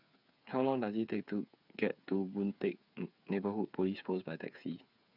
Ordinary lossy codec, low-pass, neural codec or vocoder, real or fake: none; 5.4 kHz; codec, 16 kHz, 8 kbps, FreqCodec, smaller model; fake